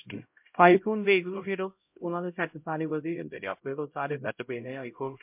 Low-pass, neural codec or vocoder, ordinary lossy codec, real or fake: 3.6 kHz; codec, 16 kHz, 0.5 kbps, X-Codec, HuBERT features, trained on LibriSpeech; MP3, 32 kbps; fake